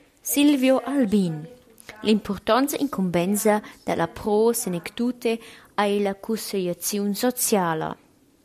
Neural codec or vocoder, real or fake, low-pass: none; real; 14.4 kHz